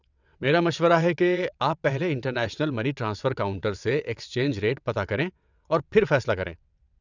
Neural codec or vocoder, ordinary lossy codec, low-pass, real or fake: vocoder, 22.05 kHz, 80 mel bands, WaveNeXt; none; 7.2 kHz; fake